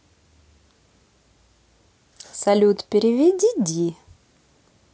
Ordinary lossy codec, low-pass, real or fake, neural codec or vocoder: none; none; real; none